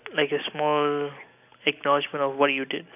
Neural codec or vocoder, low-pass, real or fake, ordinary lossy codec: none; 3.6 kHz; real; none